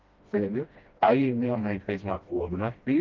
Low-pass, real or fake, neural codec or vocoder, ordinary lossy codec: 7.2 kHz; fake; codec, 16 kHz, 1 kbps, FreqCodec, smaller model; Opus, 32 kbps